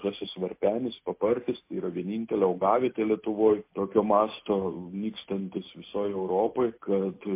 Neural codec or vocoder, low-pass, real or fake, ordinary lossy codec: none; 3.6 kHz; real; MP3, 24 kbps